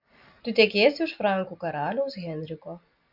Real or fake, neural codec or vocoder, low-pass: real; none; 5.4 kHz